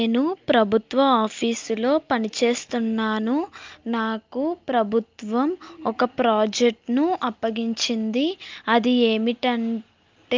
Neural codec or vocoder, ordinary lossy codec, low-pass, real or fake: none; Opus, 24 kbps; 7.2 kHz; real